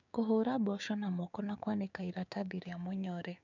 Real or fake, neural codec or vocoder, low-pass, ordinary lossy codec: fake; codec, 16 kHz, 6 kbps, DAC; 7.2 kHz; none